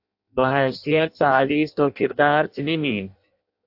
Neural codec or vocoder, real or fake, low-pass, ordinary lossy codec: codec, 16 kHz in and 24 kHz out, 0.6 kbps, FireRedTTS-2 codec; fake; 5.4 kHz; AAC, 48 kbps